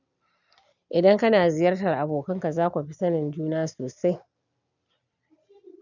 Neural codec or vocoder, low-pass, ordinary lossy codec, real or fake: none; 7.2 kHz; none; real